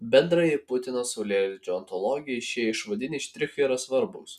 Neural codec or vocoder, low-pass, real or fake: none; 14.4 kHz; real